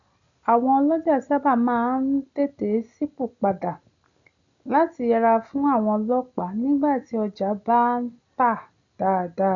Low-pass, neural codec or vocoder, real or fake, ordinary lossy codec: 7.2 kHz; none; real; none